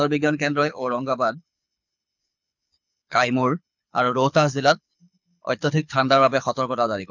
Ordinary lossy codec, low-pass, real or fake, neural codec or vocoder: none; 7.2 kHz; fake; codec, 24 kHz, 6 kbps, HILCodec